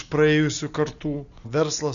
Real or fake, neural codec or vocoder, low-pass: real; none; 7.2 kHz